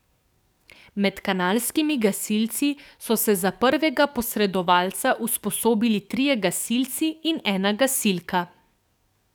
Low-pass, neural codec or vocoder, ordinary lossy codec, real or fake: none; codec, 44.1 kHz, 7.8 kbps, DAC; none; fake